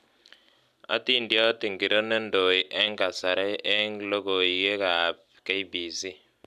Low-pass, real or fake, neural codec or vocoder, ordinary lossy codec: 14.4 kHz; real; none; none